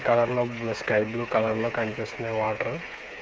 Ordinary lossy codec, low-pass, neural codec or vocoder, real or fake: none; none; codec, 16 kHz, 8 kbps, FreqCodec, smaller model; fake